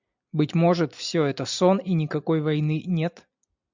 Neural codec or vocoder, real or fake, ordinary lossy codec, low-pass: none; real; MP3, 64 kbps; 7.2 kHz